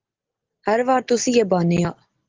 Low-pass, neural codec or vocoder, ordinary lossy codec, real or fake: 7.2 kHz; none; Opus, 16 kbps; real